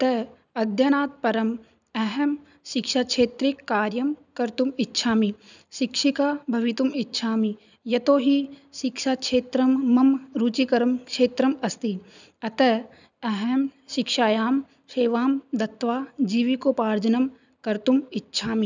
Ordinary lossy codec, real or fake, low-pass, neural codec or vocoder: none; real; 7.2 kHz; none